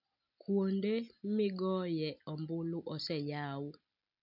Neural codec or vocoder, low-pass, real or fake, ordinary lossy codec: none; 5.4 kHz; real; none